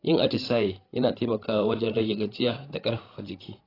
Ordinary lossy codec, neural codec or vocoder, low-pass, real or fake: AAC, 24 kbps; codec, 16 kHz, 16 kbps, FreqCodec, larger model; 5.4 kHz; fake